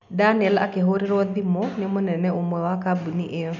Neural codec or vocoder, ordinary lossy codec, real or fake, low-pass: none; none; real; 7.2 kHz